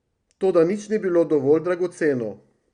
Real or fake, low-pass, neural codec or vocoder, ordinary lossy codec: real; 9.9 kHz; none; Opus, 64 kbps